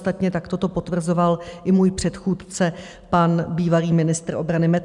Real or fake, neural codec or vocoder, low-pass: real; none; 10.8 kHz